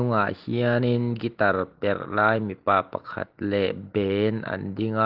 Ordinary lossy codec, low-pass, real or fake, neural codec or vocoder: Opus, 16 kbps; 5.4 kHz; real; none